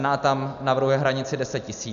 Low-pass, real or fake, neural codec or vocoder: 7.2 kHz; real; none